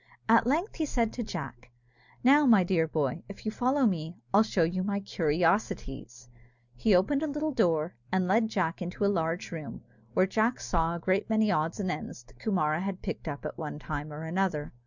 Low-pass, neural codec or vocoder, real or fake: 7.2 kHz; none; real